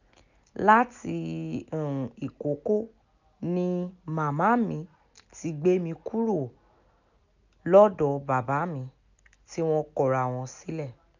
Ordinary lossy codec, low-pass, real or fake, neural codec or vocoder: none; 7.2 kHz; real; none